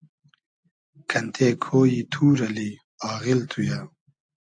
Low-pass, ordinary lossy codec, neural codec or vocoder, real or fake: 9.9 kHz; AAC, 64 kbps; none; real